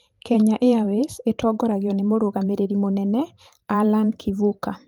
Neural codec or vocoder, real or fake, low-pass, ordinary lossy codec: vocoder, 44.1 kHz, 128 mel bands every 512 samples, BigVGAN v2; fake; 19.8 kHz; Opus, 32 kbps